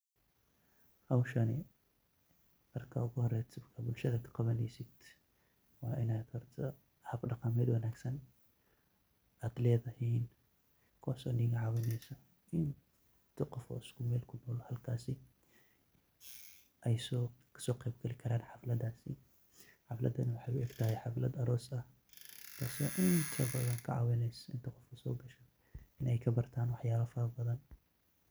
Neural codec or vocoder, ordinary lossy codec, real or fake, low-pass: none; none; real; none